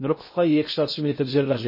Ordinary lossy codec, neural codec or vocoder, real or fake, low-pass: MP3, 24 kbps; codec, 16 kHz in and 24 kHz out, 0.6 kbps, FocalCodec, streaming, 2048 codes; fake; 5.4 kHz